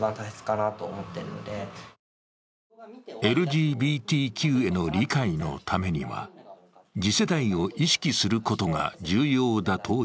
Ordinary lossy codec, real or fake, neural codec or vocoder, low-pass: none; real; none; none